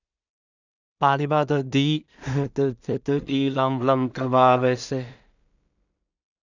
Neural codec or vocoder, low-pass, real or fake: codec, 16 kHz in and 24 kHz out, 0.4 kbps, LongCat-Audio-Codec, two codebook decoder; 7.2 kHz; fake